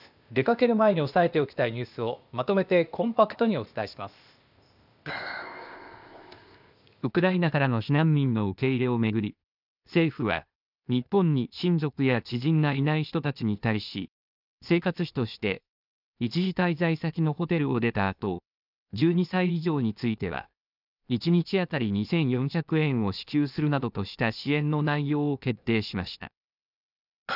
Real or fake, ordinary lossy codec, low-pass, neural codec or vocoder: fake; none; 5.4 kHz; codec, 16 kHz, 0.8 kbps, ZipCodec